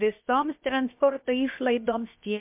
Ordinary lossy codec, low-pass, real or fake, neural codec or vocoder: MP3, 32 kbps; 3.6 kHz; fake; codec, 16 kHz, about 1 kbps, DyCAST, with the encoder's durations